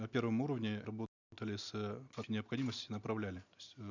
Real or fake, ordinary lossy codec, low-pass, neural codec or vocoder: real; none; 7.2 kHz; none